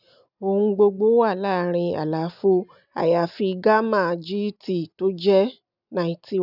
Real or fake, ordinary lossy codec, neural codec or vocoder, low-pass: real; none; none; 5.4 kHz